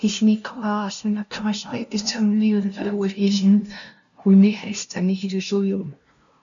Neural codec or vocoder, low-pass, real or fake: codec, 16 kHz, 0.5 kbps, FunCodec, trained on LibriTTS, 25 frames a second; 7.2 kHz; fake